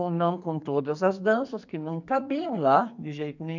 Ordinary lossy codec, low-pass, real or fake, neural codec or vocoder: none; 7.2 kHz; fake; codec, 44.1 kHz, 2.6 kbps, SNAC